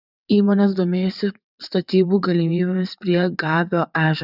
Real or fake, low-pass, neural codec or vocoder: fake; 5.4 kHz; vocoder, 22.05 kHz, 80 mel bands, WaveNeXt